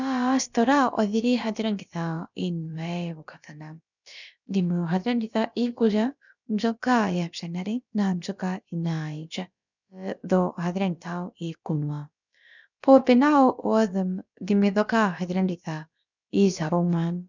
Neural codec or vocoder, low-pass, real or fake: codec, 16 kHz, about 1 kbps, DyCAST, with the encoder's durations; 7.2 kHz; fake